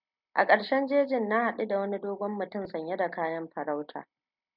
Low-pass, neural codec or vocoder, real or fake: 5.4 kHz; none; real